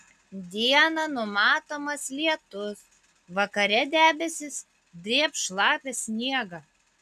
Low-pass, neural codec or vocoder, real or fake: 14.4 kHz; none; real